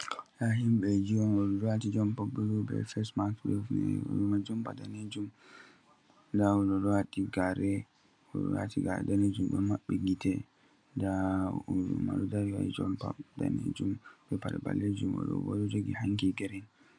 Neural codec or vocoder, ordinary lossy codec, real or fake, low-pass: none; MP3, 96 kbps; real; 9.9 kHz